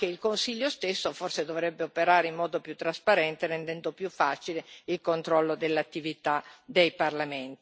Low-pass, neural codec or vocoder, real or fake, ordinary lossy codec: none; none; real; none